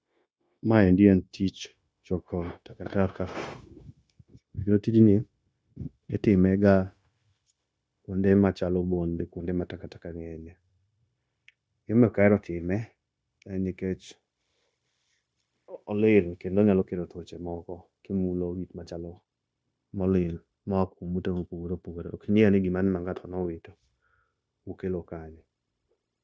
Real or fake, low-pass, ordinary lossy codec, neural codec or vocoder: fake; none; none; codec, 16 kHz, 0.9 kbps, LongCat-Audio-Codec